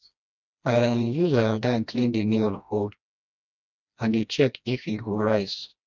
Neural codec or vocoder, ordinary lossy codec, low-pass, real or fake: codec, 16 kHz, 1 kbps, FreqCodec, smaller model; none; 7.2 kHz; fake